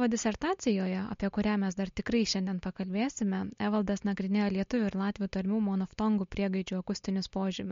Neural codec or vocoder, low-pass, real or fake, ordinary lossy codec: none; 7.2 kHz; real; MP3, 48 kbps